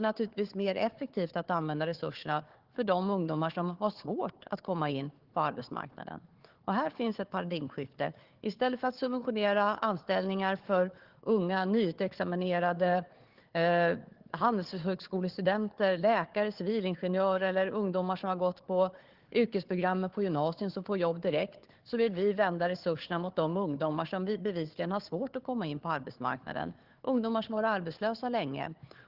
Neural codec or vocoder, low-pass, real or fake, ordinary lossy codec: codec, 16 kHz, 4 kbps, FunCodec, trained on Chinese and English, 50 frames a second; 5.4 kHz; fake; Opus, 16 kbps